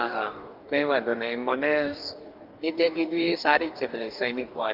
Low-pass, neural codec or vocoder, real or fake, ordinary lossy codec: 5.4 kHz; codec, 44.1 kHz, 2.6 kbps, DAC; fake; Opus, 24 kbps